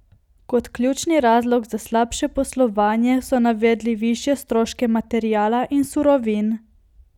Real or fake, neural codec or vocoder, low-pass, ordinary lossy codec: real; none; 19.8 kHz; none